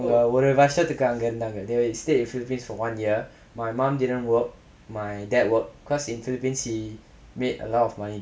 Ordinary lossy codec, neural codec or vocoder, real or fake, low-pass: none; none; real; none